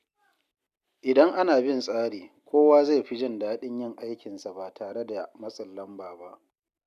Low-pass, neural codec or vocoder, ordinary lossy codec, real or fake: 14.4 kHz; none; none; real